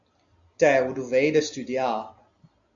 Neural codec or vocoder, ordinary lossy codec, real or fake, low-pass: none; AAC, 64 kbps; real; 7.2 kHz